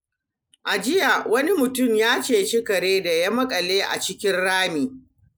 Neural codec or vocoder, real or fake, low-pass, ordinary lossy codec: none; real; none; none